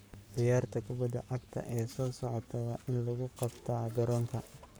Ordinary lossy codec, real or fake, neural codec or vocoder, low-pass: none; fake; codec, 44.1 kHz, 7.8 kbps, Pupu-Codec; none